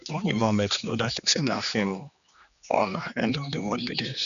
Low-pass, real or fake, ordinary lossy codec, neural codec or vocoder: 7.2 kHz; fake; none; codec, 16 kHz, 2 kbps, X-Codec, HuBERT features, trained on general audio